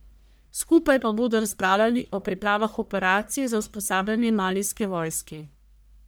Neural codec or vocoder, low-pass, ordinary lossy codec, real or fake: codec, 44.1 kHz, 1.7 kbps, Pupu-Codec; none; none; fake